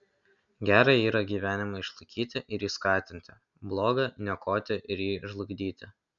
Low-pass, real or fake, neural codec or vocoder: 7.2 kHz; real; none